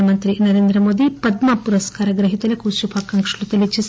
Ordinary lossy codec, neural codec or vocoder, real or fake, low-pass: none; none; real; none